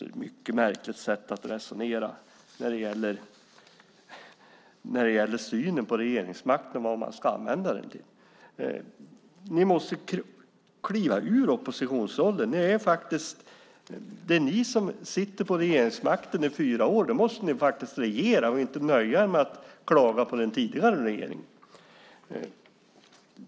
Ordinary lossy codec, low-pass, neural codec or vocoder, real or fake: none; none; none; real